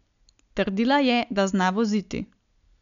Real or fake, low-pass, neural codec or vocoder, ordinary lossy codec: real; 7.2 kHz; none; none